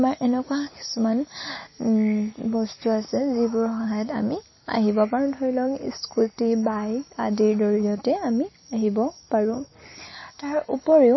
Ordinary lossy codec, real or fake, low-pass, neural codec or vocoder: MP3, 24 kbps; real; 7.2 kHz; none